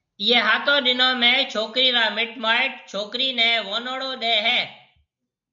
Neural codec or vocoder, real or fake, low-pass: none; real; 7.2 kHz